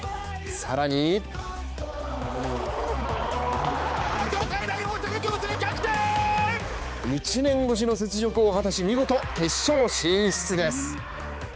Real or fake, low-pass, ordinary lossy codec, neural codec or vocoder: fake; none; none; codec, 16 kHz, 4 kbps, X-Codec, HuBERT features, trained on balanced general audio